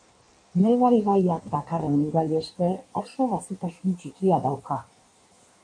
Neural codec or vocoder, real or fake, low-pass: codec, 16 kHz in and 24 kHz out, 1.1 kbps, FireRedTTS-2 codec; fake; 9.9 kHz